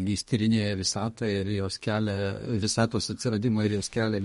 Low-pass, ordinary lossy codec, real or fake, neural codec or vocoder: 14.4 kHz; MP3, 48 kbps; fake; codec, 32 kHz, 1.9 kbps, SNAC